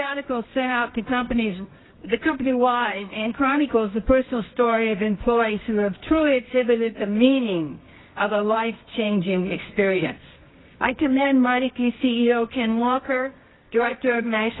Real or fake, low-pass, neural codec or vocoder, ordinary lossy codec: fake; 7.2 kHz; codec, 24 kHz, 0.9 kbps, WavTokenizer, medium music audio release; AAC, 16 kbps